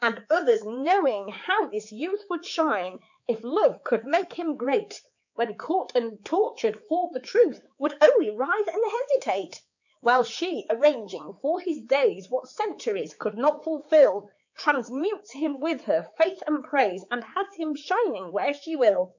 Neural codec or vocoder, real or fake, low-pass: codec, 16 kHz, 4 kbps, X-Codec, HuBERT features, trained on balanced general audio; fake; 7.2 kHz